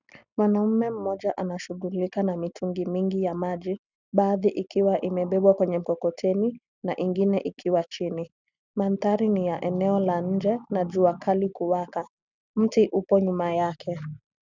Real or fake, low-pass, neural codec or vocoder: real; 7.2 kHz; none